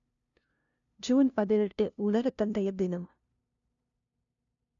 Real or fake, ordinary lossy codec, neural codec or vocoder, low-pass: fake; none; codec, 16 kHz, 0.5 kbps, FunCodec, trained on LibriTTS, 25 frames a second; 7.2 kHz